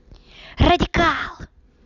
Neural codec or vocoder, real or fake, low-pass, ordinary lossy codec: none; real; 7.2 kHz; none